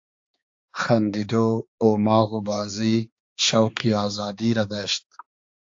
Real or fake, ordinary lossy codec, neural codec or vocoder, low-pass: fake; AAC, 48 kbps; codec, 16 kHz, 2 kbps, X-Codec, HuBERT features, trained on balanced general audio; 7.2 kHz